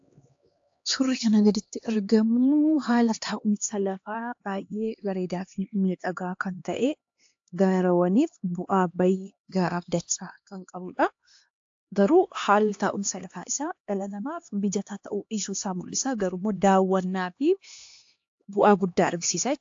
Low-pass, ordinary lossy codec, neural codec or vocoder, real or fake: 7.2 kHz; AAC, 48 kbps; codec, 16 kHz, 2 kbps, X-Codec, HuBERT features, trained on LibriSpeech; fake